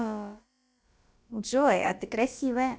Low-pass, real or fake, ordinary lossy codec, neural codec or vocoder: none; fake; none; codec, 16 kHz, about 1 kbps, DyCAST, with the encoder's durations